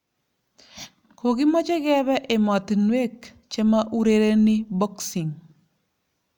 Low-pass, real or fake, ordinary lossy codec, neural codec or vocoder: 19.8 kHz; real; none; none